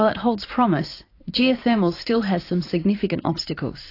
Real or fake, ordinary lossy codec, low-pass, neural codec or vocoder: fake; AAC, 32 kbps; 5.4 kHz; vocoder, 44.1 kHz, 80 mel bands, Vocos